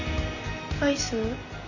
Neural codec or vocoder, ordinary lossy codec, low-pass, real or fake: none; none; 7.2 kHz; real